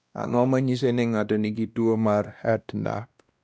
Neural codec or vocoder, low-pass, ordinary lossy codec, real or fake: codec, 16 kHz, 1 kbps, X-Codec, WavLM features, trained on Multilingual LibriSpeech; none; none; fake